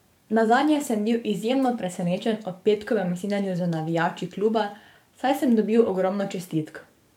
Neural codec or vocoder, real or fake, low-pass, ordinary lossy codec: codec, 44.1 kHz, 7.8 kbps, DAC; fake; 19.8 kHz; MP3, 96 kbps